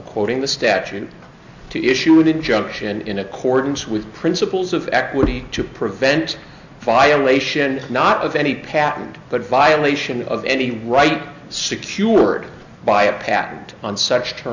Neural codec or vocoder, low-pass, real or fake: none; 7.2 kHz; real